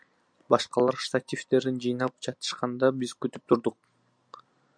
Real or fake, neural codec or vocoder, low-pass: real; none; 9.9 kHz